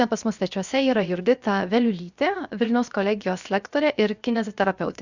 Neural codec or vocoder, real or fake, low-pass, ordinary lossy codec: codec, 16 kHz, 0.7 kbps, FocalCodec; fake; 7.2 kHz; Opus, 64 kbps